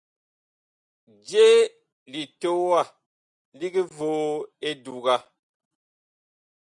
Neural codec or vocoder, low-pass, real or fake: none; 10.8 kHz; real